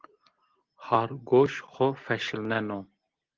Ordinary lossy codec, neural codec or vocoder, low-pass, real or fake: Opus, 16 kbps; none; 7.2 kHz; real